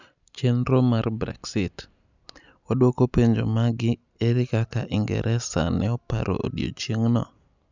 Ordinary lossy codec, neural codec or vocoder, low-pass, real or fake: none; none; 7.2 kHz; real